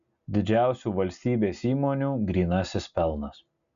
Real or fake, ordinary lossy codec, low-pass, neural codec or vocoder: real; MP3, 64 kbps; 7.2 kHz; none